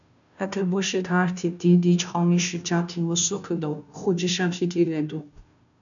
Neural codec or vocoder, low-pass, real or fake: codec, 16 kHz, 0.5 kbps, FunCodec, trained on Chinese and English, 25 frames a second; 7.2 kHz; fake